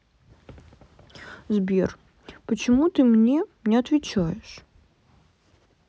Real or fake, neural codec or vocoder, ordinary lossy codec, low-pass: real; none; none; none